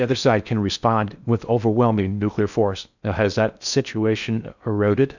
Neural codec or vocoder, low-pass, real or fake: codec, 16 kHz in and 24 kHz out, 0.6 kbps, FocalCodec, streaming, 4096 codes; 7.2 kHz; fake